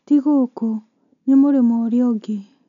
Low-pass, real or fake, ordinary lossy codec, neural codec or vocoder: 7.2 kHz; real; none; none